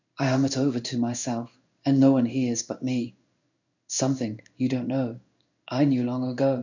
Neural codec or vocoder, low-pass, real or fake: codec, 16 kHz in and 24 kHz out, 1 kbps, XY-Tokenizer; 7.2 kHz; fake